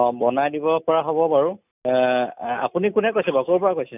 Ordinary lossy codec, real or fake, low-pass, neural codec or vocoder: none; real; 3.6 kHz; none